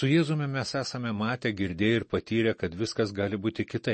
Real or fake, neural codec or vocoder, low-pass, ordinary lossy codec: real; none; 10.8 kHz; MP3, 32 kbps